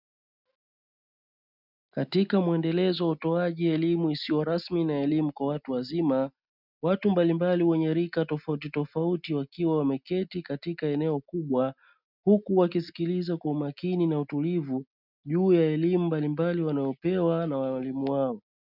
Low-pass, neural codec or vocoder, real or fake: 5.4 kHz; none; real